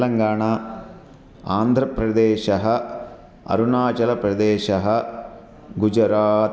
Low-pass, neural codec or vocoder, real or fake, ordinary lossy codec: none; none; real; none